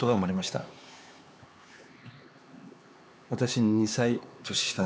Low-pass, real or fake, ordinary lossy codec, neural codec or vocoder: none; fake; none; codec, 16 kHz, 4 kbps, X-Codec, HuBERT features, trained on LibriSpeech